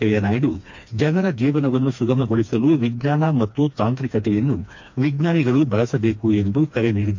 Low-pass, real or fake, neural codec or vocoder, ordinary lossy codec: 7.2 kHz; fake; codec, 16 kHz, 2 kbps, FreqCodec, smaller model; MP3, 48 kbps